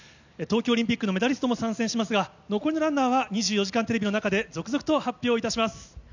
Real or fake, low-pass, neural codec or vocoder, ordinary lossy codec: real; 7.2 kHz; none; none